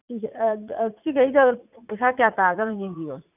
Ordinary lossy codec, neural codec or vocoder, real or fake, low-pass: none; vocoder, 44.1 kHz, 80 mel bands, Vocos; fake; 3.6 kHz